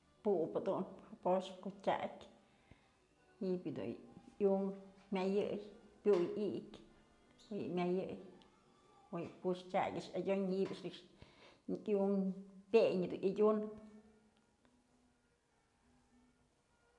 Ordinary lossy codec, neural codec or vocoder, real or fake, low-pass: none; none; real; 10.8 kHz